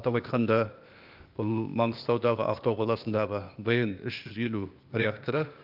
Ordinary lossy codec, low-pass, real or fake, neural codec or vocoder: Opus, 24 kbps; 5.4 kHz; fake; codec, 16 kHz, 0.8 kbps, ZipCodec